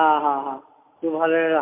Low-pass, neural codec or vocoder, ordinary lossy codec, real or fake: 3.6 kHz; none; MP3, 24 kbps; real